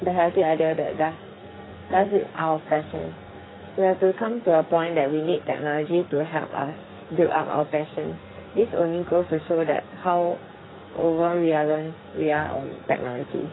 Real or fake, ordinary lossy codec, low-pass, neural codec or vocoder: fake; AAC, 16 kbps; 7.2 kHz; codec, 44.1 kHz, 2.6 kbps, SNAC